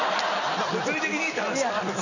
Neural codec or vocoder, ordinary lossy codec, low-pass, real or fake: none; none; 7.2 kHz; real